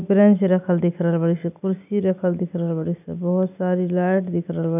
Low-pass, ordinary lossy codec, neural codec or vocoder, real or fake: 3.6 kHz; none; none; real